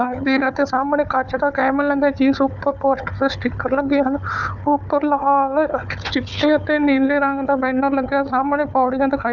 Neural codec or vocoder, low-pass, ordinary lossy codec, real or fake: codec, 16 kHz, 4 kbps, FunCodec, trained on Chinese and English, 50 frames a second; 7.2 kHz; none; fake